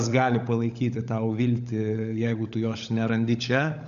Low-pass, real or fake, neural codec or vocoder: 7.2 kHz; fake; codec, 16 kHz, 16 kbps, FunCodec, trained on LibriTTS, 50 frames a second